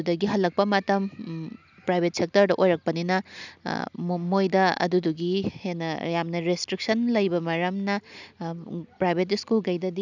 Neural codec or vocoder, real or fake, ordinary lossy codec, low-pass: none; real; none; 7.2 kHz